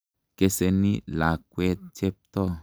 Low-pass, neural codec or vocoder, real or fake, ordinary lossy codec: none; none; real; none